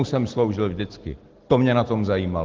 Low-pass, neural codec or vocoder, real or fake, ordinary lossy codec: 7.2 kHz; none; real; Opus, 16 kbps